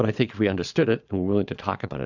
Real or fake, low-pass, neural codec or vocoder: fake; 7.2 kHz; vocoder, 22.05 kHz, 80 mel bands, Vocos